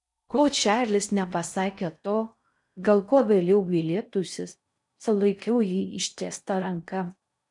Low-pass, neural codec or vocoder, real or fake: 10.8 kHz; codec, 16 kHz in and 24 kHz out, 0.6 kbps, FocalCodec, streaming, 4096 codes; fake